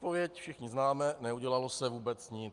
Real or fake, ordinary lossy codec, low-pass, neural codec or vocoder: real; Opus, 32 kbps; 10.8 kHz; none